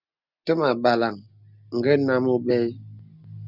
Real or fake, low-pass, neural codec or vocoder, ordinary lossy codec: real; 5.4 kHz; none; Opus, 64 kbps